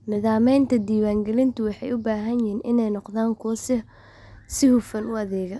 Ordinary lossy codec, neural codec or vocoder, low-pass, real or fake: none; none; none; real